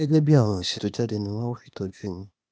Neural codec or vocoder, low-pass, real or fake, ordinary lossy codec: codec, 16 kHz, 0.8 kbps, ZipCodec; none; fake; none